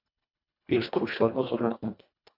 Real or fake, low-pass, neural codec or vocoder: fake; 5.4 kHz; codec, 24 kHz, 1.5 kbps, HILCodec